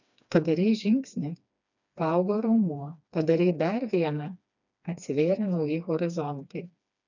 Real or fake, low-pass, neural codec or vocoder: fake; 7.2 kHz; codec, 16 kHz, 2 kbps, FreqCodec, smaller model